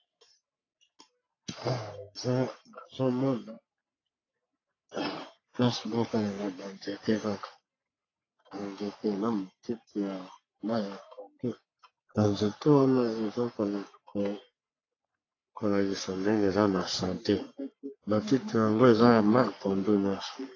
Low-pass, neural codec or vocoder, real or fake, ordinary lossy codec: 7.2 kHz; codec, 44.1 kHz, 3.4 kbps, Pupu-Codec; fake; AAC, 32 kbps